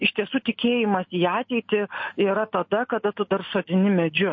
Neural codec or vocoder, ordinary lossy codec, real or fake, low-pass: none; MP3, 32 kbps; real; 7.2 kHz